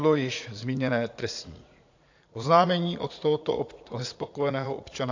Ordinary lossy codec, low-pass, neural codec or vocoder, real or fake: AAC, 48 kbps; 7.2 kHz; vocoder, 44.1 kHz, 80 mel bands, Vocos; fake